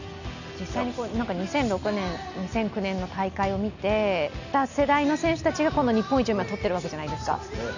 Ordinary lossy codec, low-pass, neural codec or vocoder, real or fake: none; 7.2 kHz; none; real